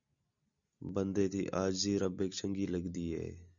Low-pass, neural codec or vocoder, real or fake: 7.2 kHz; none; real